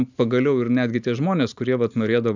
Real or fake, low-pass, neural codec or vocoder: real; 7.2 kHz; none